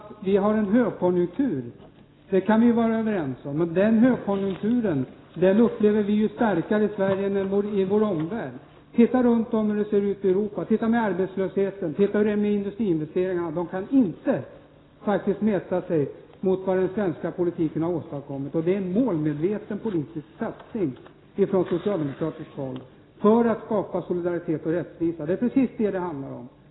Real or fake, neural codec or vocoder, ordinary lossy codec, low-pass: real; none; AAC, 16 kbps; 7.2 kHz